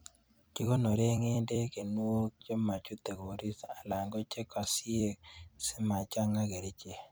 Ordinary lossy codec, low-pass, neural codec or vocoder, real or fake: none; none; vocoder, 44.1 kHz, 128 mel bands every 256 samples, BigVGAN v2; fake